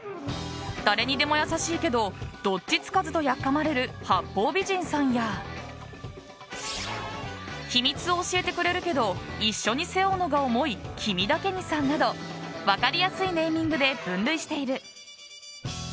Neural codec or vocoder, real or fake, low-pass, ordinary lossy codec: none; real; none; none